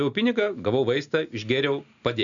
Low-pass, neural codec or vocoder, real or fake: 7.2 kHz; none; real